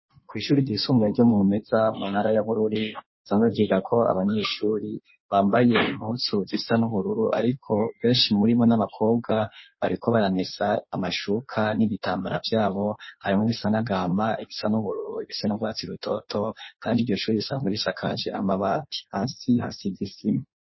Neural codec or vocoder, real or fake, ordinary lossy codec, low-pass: codec, 16 kHz in and 24 kHz out, 1.1 kbps, FireRedTTS-2 codec; fake; MP3, 24 kbps; 7.2 kHz